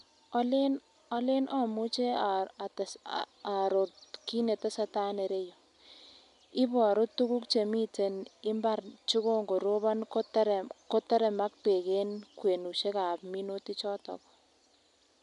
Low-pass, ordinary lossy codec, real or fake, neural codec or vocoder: 10.8 kHz; none; real; none